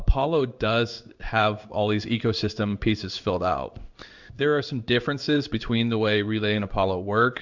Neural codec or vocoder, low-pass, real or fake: codec, 16 kHz in and 24 kHz out, 1 kbps, XY-Tokenizer; 7.2 kHz; fake